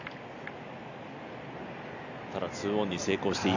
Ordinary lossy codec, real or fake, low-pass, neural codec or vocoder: none; real; 7.2 kHz; none